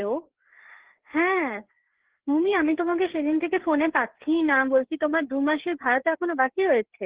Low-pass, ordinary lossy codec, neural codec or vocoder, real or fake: 3.6 kHz; Opus, 16 kbps; codec, 16 kHz, 4 kbps, FreqCodec, larger model; fake